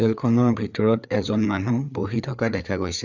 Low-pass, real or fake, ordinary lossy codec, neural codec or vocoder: 7.2 kHz; fake; none; codec, 16 kHz, 4 kbps, FreqCodec, larger model